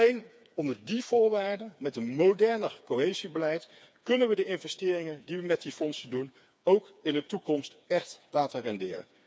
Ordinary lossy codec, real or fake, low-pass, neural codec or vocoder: none; fake; none; codec, 16 kHz, 4 kbps, FreqCodec, smaller model